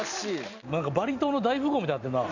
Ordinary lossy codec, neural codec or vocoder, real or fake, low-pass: none; none; real; 7.2 kHz